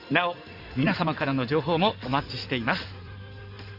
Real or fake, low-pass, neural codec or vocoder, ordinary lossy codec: fake; 5.4 kHz; codec, 16 kHz in and 24 kHz out, 2.2 kbps, FireRedTTS-2 codec; Opus, 64 kbps